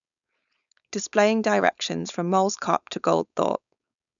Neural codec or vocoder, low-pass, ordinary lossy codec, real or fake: codec, 16 kHz, 4.8 kbps, FACodec; 7.2 kHz; none; fake